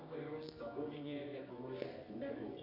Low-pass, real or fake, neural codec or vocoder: 5.4 kHz; fake; codec, 24 kHz, 0.9 kbps, WavTokenizer, medium music audio release